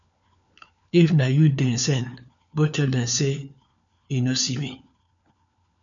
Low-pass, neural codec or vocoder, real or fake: 7.2 kHz; codec, 16 kHz, 4 kbps, FunCodec, trained on LibriTTS, 50 frames a second; fake